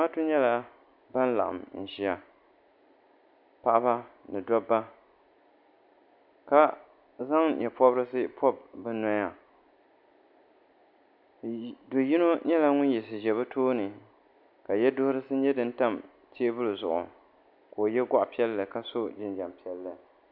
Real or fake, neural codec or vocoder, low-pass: real; none; 5.4 kHz